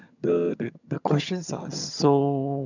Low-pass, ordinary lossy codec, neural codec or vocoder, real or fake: 7.2 kHz; none; vocoder, 22.05 kHz, 80 mel bands, HiFi-GAN; fake